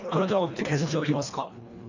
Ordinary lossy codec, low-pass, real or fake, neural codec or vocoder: none; 7.2 kHz; fake; codec, 24 kHz, 1.5 kbps, HILCodec